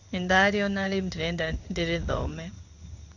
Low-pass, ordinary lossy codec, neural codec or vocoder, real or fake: 7.2 kHz; none; codec, 16 kHz in and 24 kHz out, 1 kbps, XY-Tokenizer; fake